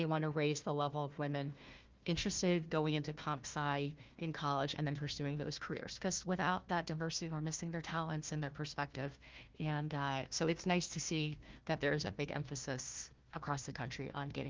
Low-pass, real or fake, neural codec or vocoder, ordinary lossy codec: 7.2 kHz; fake; codec, 16 kHz, 1 kbps, FunCodec, trained on Chinese and English, 50 frames a second; Opus, 24 kbps